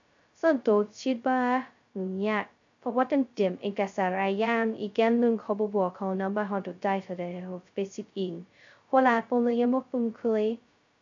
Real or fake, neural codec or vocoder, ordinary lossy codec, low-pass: fake; codec, 16 kHz, 0.2 kbps, FocalCodec; AAC, 64 kbps; 7.2 kHz